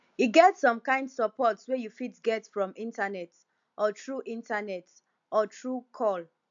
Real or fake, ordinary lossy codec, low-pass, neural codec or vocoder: real; AAC, 64 kbps; 7.2 kHz; none